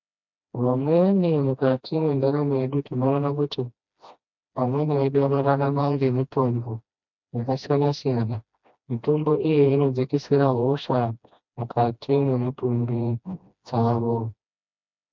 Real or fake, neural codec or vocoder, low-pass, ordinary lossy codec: fake; codec, 16 kHz, 1 kbps, FreqCodec, smaller model; 7.2 kHz; MP3, 64 kbps